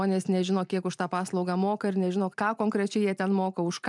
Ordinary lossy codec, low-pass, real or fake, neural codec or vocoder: MP3, 96 kbps; 10.8 kHz; fake; vocoder, 44.1 kHz, 128 mel bands every 512 samples, BigVGAN v2